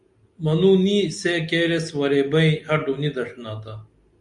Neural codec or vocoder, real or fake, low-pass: none; real; 10.8 kHz